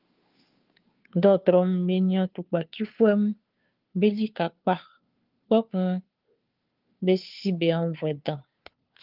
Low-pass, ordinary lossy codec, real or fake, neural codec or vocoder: 5.4 kHz; Opus, 32 kbps; fake; codec, 16 kHz, 2 kbps, FunCodec, trained on Chinese and English, 25 frames a second